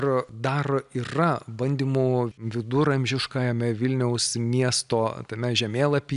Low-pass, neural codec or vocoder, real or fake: 10.8 kHz; none; real